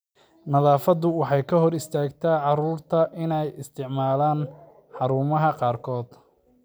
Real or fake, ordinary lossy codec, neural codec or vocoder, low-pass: real; none; none; none